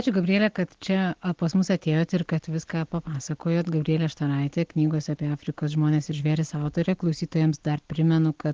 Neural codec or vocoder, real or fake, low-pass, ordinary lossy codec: none; real; 7.2 kHz; Opus, 16 kbps